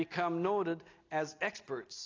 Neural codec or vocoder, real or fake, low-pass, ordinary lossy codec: none; real; 7.2 kHz; AAC, 32 kbps